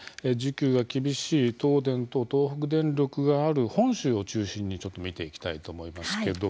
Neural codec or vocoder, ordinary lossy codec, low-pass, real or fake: none; none; none; real